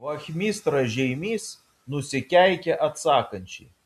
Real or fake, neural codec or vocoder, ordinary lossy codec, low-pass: real; none; MP3, 64 kbps; 14.4 kHz